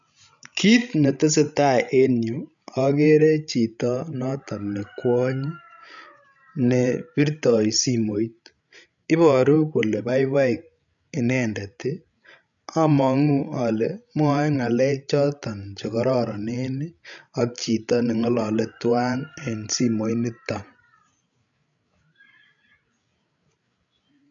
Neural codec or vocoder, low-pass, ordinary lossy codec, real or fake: codec, 16 kHz, 16 kbps, FreqCodec, larger model; 7.2 kHz; none; fake